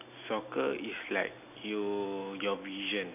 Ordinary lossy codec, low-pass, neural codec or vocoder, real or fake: AAC, 32 kbps; 3.6 kHz; none; real